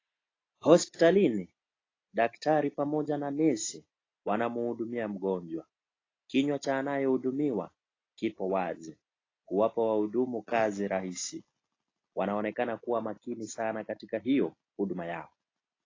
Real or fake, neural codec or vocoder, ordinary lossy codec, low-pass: real; none; AAC, 32 kbps; 7.2 kHz